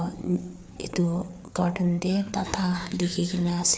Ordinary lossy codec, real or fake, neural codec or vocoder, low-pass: none; fake; codec, 16 kHz, 4 kbps, FreqCodec, larger model; none